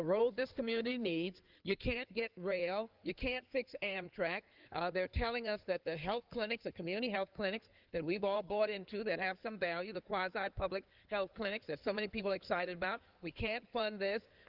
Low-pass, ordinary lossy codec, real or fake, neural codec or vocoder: 5.4 kHz; Opus, 24 kbps; fake; codec, 16 kHz in and 24 kHz out, 2.2 kbps, FireRedTTS-2 codec